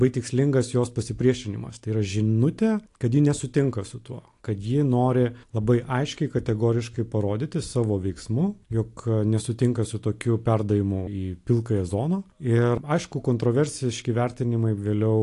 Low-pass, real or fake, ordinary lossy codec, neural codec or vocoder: 10.8 kHz; real; AAC, 48 kbps; none